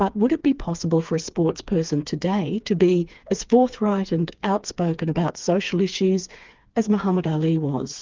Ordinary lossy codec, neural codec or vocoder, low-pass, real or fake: Opus, 24 kbps; codec, 16 kHz, 4 kbps, FreqCodec, smaller model; 7.2 kHz; fake